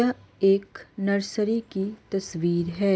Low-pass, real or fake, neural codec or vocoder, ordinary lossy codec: none; real; none; none